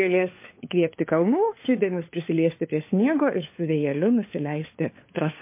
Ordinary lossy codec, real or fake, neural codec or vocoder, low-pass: MP3, 24 kbps; fake; codec, 16 kHz, 4 kbps, FunCodec, trained on LibriTTS, 50 frames a second; 3.6 kHz